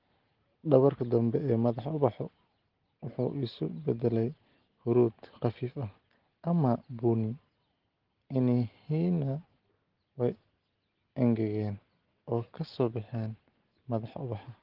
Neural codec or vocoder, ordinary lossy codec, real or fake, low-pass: none; Opus, 16 kbps; real; 5.4 kHz